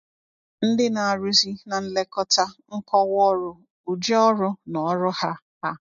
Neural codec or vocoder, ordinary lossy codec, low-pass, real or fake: none; MP3, 48 kbps; 7.2 kHz; real